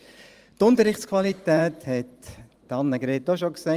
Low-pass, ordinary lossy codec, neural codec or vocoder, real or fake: 14.4 kHz; Opus, 32 kbps; vocoder, 44.1 kHz, 128 mel bands every 512 samples, BigVGAN v2; fake